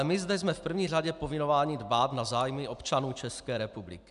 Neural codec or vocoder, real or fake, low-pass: none; real; 10.8 kHz